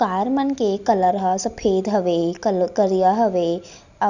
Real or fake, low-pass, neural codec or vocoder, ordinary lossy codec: real; 7.2 kHz; none; none